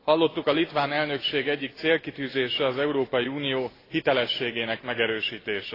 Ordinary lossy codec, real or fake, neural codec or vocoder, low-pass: AAC, 24 kbps; real; none; 5.4 kHz